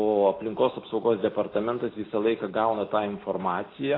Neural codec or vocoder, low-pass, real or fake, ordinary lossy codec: none; 5.4 kHz; real; AAC, 24 kbps